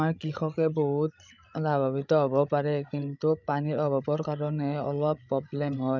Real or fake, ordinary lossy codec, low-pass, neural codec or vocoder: fake; none; 7.2 kHz; codec, 16 kHz, 16 kbps, FreqCodec, larger model